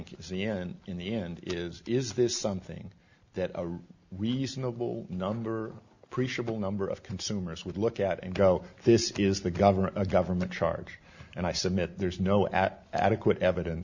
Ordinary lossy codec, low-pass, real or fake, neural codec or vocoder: Opus, 64 kbps; 7.2 kHz; real; none